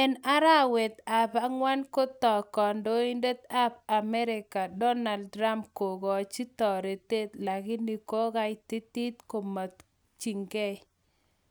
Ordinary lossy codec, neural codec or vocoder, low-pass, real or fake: none; none; none; real